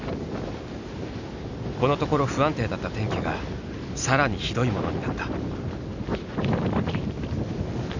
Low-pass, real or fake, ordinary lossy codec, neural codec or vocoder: 7.2 kHz; real; none; none